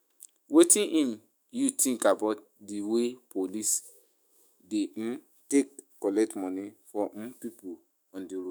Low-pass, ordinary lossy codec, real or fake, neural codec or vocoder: none; none; fake; autoencoder, 48 kHz, 128 numbers a frame, DAC-VAE, trained on Japanese speech